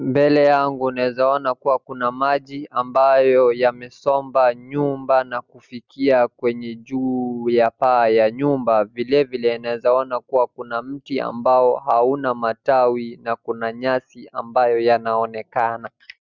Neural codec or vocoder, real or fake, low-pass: none; real; 7.2 kHz